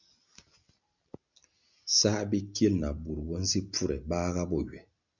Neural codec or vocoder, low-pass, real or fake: none; 7.2 kHz; real